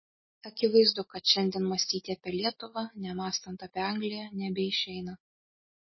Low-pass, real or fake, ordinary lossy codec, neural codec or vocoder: 7.2 kHz; real; MP3, 24 kbps; none